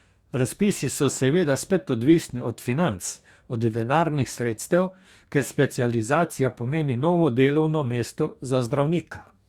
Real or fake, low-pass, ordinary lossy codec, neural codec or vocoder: fake; 19.8 kHz; Opus, 64 kbps; codec, 44.1 kHz, 2.6 kbps, DAC